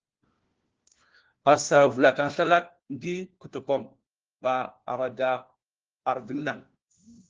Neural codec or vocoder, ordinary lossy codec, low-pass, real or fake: codec, 16 kHz, 1 kbps, FunCodec, trained on LibriTTS, 50 frames a second; Opus, 16 kbps; 7.2 kHz; fake